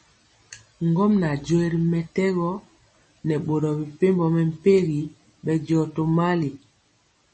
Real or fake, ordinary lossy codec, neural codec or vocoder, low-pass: real; MP3, 32 kbps; none; 10.8 kHz